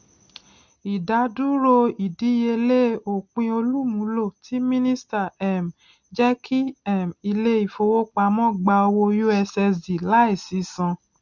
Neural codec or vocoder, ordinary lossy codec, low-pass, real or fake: none; none; 7.2 kHz; real